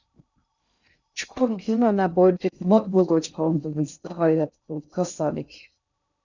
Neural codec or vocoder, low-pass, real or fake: codec, 16 kHz in and 24 kHz out, 0.6 kbps, FocalCodec, streaming, 2048 codes; 7.2 kHz; fake